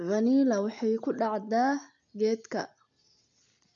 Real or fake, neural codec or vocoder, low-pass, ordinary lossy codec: real; none; 7.2 kHz; MP3, 96 kbps